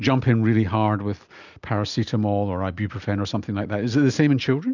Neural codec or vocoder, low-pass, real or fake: none; 7.2 kHz; real